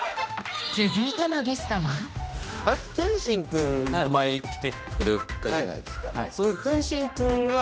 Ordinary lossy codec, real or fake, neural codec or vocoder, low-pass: none; fake; codec, 16 kHz, 1 kbps, X-Codec, HuBERT features, trained on general audio; none